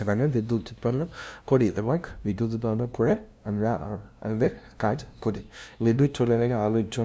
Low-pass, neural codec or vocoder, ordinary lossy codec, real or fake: none; codec, 16 kHz, 0.5 kbps, FunCodec, trained on LibriTTS, 25 frames a second; none; fake